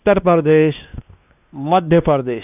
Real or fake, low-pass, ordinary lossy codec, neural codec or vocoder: fake; 3.6 kHz; none; codec, 16 kHz, 2 kbps, X-Codec, WavLM features, trained on Multilingual LibriSpeech